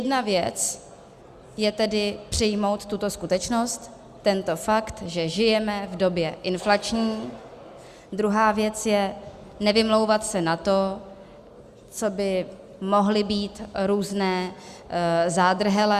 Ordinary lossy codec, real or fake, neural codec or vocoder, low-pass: Opus, 64 kbps; real; none; 14.4 kHz